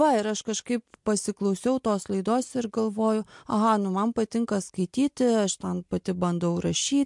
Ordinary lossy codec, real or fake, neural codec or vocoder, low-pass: MP3, 64 kbps; real; none; 10.8 kHz